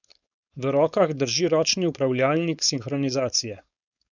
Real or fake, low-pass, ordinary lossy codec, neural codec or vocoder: fake; 7.2 kHz; none; codec, 16 kHz, 4.8 kbps, FACodec